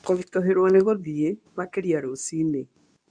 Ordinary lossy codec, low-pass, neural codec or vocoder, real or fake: none; 9.9 kHz; codec, 24 kHz, 0.9 kbps, WavTokenizer, medium speech release version 2; fake